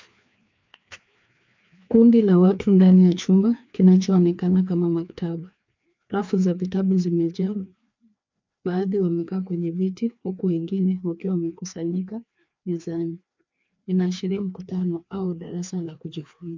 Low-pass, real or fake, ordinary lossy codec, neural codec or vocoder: 7.2 kHz; fake; MP3, 64 kbps; codec, 16 kHz, 2 kbps, FreqCodec, larger model